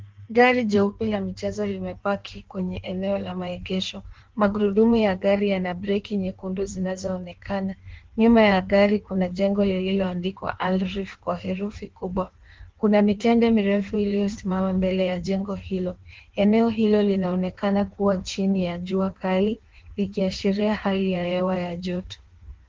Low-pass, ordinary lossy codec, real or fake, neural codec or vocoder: 7.2 kHz; Opus, 16 kbps; fake; codec, 16 kHz in and 24 kHz out, 1.1 kbps, FireRedTTS-2 codec